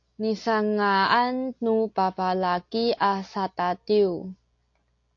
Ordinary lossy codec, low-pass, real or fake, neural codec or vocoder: AAC, 48 kbps; 7.2 kHz; real; none